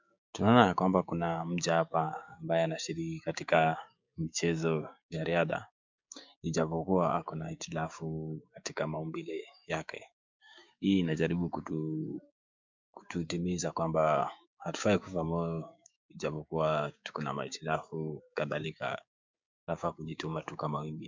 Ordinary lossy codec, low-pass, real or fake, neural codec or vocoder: MP3, 64 kbps; 7.2 kHz; fake; codec, 16 kHz, 6 kbps, DAC